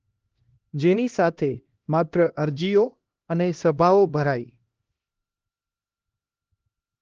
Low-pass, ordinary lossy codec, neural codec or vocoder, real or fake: 7.2 kHz; Opus, 16 kbps; codec, 16 kHz, 1 kbps, X-Codec, HuBERT features, trained on LibriSpeech; fake